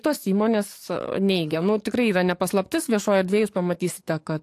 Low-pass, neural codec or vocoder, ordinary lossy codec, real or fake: 14.4 kHz; codec, 44.1 kHz, 7.8 kbps, DAC; AAC, 64 kbps; fake